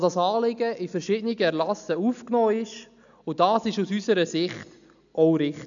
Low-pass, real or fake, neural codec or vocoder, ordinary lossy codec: 7.2 kHz; real; none; none